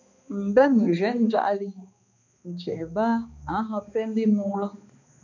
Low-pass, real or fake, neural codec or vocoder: 7.2 kHz; fake; codec, 16 kHz, 2 kbps, X-Codec, HuBERT features, trained on balanced general audio